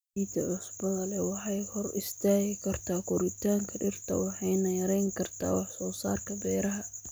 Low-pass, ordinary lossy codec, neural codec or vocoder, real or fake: none; none; none; real